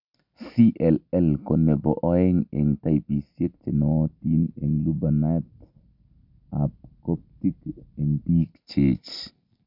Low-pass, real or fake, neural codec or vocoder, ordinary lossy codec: 5.4 kHz; real; none; none